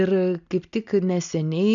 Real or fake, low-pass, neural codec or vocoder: real; 7.2 kHz; none